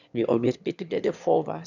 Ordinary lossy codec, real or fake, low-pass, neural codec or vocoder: none; fake; 7.2 kHz; autoencoder, 22.05 kHz, a latent of 192 numbers a frame, VITS, trained on one speaker